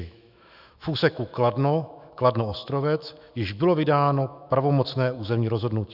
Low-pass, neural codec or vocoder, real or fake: 5.4 kHz; autoencoder, 48 kHz, 128 numbers a frame, DAC-VAE, trained on Japanese speech; fake